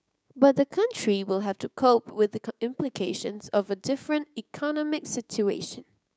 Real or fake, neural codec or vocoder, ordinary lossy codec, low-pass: fake; codec, 16 kHz, 6 kbps, DAC; none; none